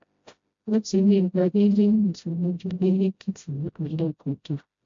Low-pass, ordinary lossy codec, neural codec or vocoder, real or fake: 7.2 kHz; MP3, 48 kbps; codec, 16 kHz, 0.5 kbps, FreqCodec, smaller model; fake